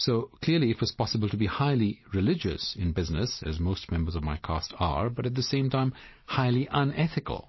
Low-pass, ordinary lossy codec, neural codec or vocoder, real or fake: 7.2 kHz; MP3, 24 kbps; vocoder, 44.1 kHz, 128 mel bands every 256 samples, BigVGAN v2; fake